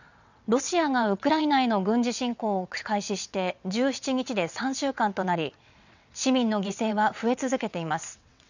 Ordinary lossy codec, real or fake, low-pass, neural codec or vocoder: none; fake; 7.2 kHz; vocoder, 22.05 kHz, 80 mel bands, WaveNeXt